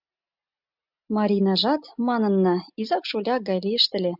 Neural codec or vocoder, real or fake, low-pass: none; real; 5.4 kHz